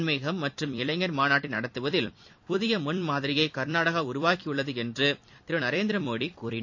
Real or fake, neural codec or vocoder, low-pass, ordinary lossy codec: fake; vocoder, 44.1 kHz, 128 mel bands every 256 samples, BigVGAN v2; 7.2 kHz; AAC, 48 kbps